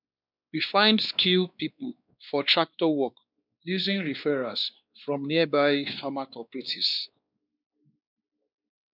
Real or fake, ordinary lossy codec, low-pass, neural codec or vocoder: fake; none; 5.4 kHz; codec, 16 kHz, 2 kbps, X-Codec, WavLM features, trained on Multilingual LibriSpeech